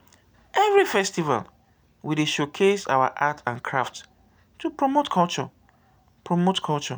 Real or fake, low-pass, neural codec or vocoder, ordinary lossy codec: real; none; none; none